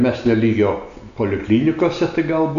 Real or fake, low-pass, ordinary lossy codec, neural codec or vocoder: real; 7.2 kHz; AAC, 96 kbps; none